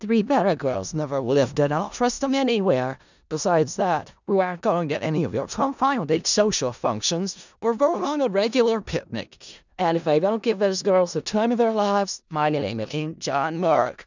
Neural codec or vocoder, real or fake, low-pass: codec, 16 kHz in and 24 kHz out, 0.4 kbps, LongCat-Audio-Codec, four codebook decoder; fake; 7.2 kHz